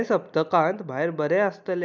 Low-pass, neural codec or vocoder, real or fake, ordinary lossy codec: none; none; real; none